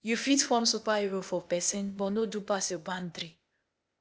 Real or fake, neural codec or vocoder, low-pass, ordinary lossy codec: fake; codec, 16 kHz, 0.8 kbps, ZipCodec; none; none